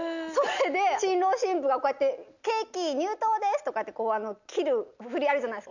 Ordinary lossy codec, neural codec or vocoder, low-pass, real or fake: none; none; 7.2 kHz; real